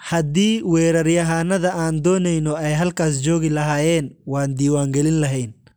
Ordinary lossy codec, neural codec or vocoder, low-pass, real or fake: none; none; none; real